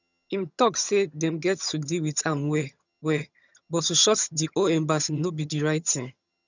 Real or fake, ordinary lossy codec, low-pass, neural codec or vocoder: fake; none; 7.2 kHz; vocoder, 22.05 kHz, 80 mel bands, HiFi-GAN